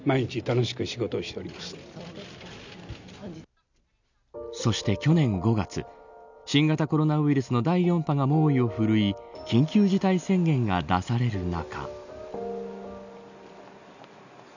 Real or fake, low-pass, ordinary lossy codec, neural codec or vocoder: real; 7.2 kHz; none; none